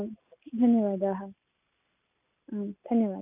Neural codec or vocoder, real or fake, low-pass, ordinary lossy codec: none; real; 3.6 kHz; none